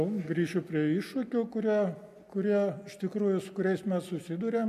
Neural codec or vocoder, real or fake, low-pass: none; real; 14.4 kHz